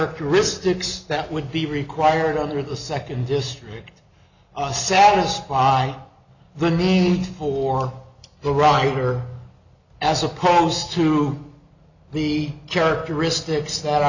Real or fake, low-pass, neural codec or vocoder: real; 7.2 kHz; none